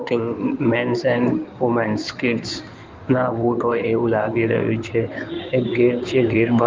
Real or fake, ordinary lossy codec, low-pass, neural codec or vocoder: fake; Opus, 32 kbps; 7.2 kHz; codec, 16 kHz in and 24 kHz out, 2.2 kbps, FireRedTTS-2 codec